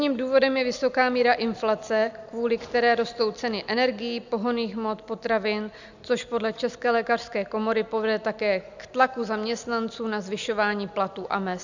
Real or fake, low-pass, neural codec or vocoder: real; 7.2 kHz; none